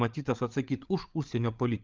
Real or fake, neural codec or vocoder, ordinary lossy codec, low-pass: fake; codec, 16 kHz, 8 kbps, FreqCodec, larger model; Opus, 32 kbps; 7.2 kHz